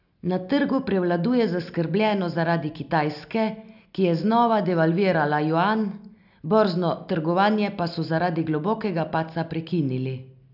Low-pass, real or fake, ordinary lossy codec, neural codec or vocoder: 5.4 kHz; real; none; none